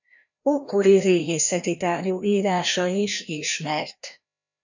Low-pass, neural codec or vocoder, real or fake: 7.2 kHz; codec, 16 kHz, 1 kbps, FreqCodec, larger model; fake